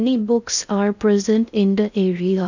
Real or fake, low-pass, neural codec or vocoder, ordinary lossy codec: fake; 7.2 kHz; codec, 16 kHz in and 24 kHz out, 0.6 kbps, FocalCodec, streaming, 4096 codes; none